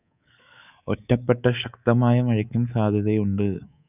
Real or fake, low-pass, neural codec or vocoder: fake; 3.6 kHz; codec, 24 kHz, 3.1 kbps, DualCodec